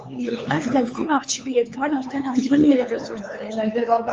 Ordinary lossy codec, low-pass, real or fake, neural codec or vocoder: Opus, 24 kbps; 7.2 kHz; fake; codec, 16 kHz, 4 kbps, X-Codec, HuBERT features, trained on LibriSpeech